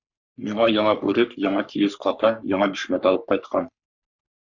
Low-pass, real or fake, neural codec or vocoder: 7.2 kHz; fake; codec, 44.1 kHz, 3.4 kbps, Pupu-Codec